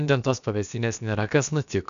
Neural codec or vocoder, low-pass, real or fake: codec, 16 kHz, about 1 kbps, DyCAST, with the encoder's durations; 7.2 kHz; fake